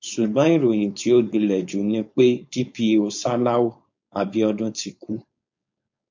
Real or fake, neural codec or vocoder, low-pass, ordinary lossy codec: fake; codec, 16 kHz, 4.8 kbps, FACodec; 7.2 kHz; MP3, 48 kbps